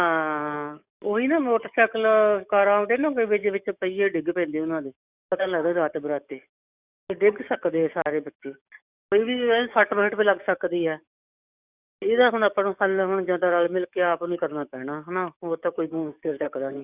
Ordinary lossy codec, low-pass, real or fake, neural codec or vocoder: Opus, 64 kbps; 3.6 kHz; fake; codec, 44.1 kHz, 7.8 kbps, DAC